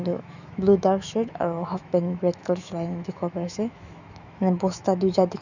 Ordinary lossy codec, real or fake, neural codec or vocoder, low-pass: none; fake; autoencoder, 48 kHz, 128 numbers a frame, DAC-VAE, trained on Japanese speech; 7.2 kHz